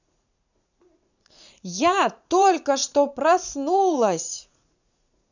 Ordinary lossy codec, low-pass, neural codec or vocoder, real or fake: none; 7.2 kHz; vocoder, 44.1 kHz, 80 mel bands, Vocos; fake